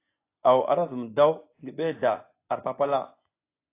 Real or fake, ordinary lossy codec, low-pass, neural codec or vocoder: fake; AAC, 24 kbps; 3.6 kHz; vocoder, 24 kHz, 100 mel bands, Vocos